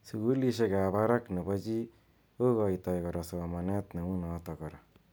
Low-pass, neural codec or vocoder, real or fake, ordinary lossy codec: none; none; real; none